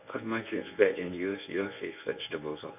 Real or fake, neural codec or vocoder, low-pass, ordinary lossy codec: fake; codec, 24 kHz, 0.9 kbps, WavTokenizer, medium speech release version 1; 3.6 kHz; none